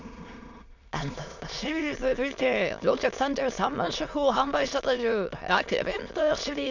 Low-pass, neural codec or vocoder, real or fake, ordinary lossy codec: 7.2 kHz; autoencoder, 22.05 kHz, a latent of 192 numbers a frame, VITS, trained on many speakers; fake; none